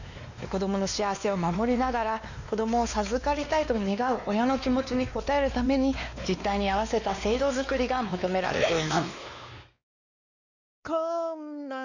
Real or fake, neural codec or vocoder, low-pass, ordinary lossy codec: fake; codec, 16 kHz, 2 kbps, X-Codec, WavLM features, trained on Multilingual LibriSpeech; 7.2 kHz; none